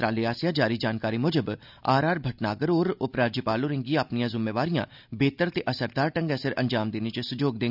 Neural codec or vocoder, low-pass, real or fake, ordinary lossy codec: none; 5.4 kHz; real; none